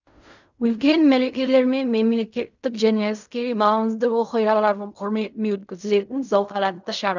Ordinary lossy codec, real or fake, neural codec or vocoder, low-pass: none; fake; codec, 16 kHz in and 24 kHz out, 0.4 kbps, LongCat-Audio-Codec, fine tuned four codebook decoder; 7.2 kHz